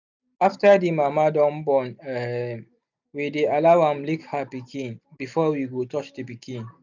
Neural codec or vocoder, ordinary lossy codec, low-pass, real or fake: none; none; 7.2 kHz; real